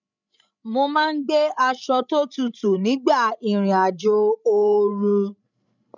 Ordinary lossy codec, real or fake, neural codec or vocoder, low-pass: none; fake; codec, 16 kHz, 16 kbps, FreqCodec, larger model; 7.2 kHz